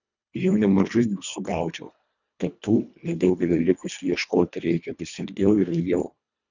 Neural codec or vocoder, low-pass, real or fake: codec, 24 kHz, 1.5 kbps, HILCodec; 7.2 kHz; fake